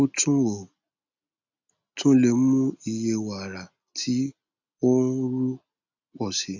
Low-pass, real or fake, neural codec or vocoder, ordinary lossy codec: 7.2 kHz; real; none; none